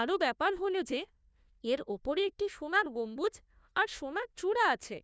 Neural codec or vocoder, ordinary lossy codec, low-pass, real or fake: codec, 16 kHz, 1 kbps, FunCodec, trained on Chinese and English, 50 frames a second; none; none; fake